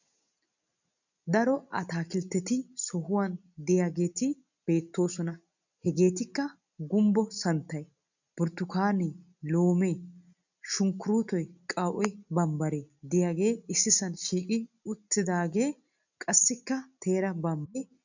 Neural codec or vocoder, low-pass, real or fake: none; 7.2 kHz; real